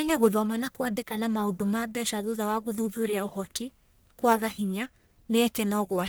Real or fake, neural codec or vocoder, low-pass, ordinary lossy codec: fake; codec, 44.1 kHz, 1.7 kbps, Pupu-Codec; none; none